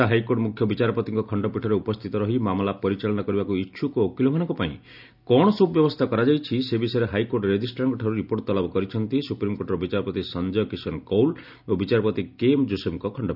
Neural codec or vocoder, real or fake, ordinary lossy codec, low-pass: none; real; none; 5.4 kHz